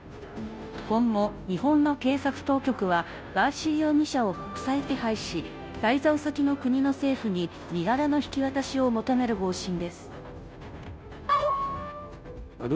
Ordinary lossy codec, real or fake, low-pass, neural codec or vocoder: none; fake; none; codec, 16 kHz, 0.5 kbps, FunCodec, trained on Chinese and English, 25 frames a second